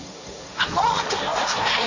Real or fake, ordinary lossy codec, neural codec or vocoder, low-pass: fake; none; codec, 16 kHz, 1.1 kbps, Voila-Tokenizer; 7.2 kHz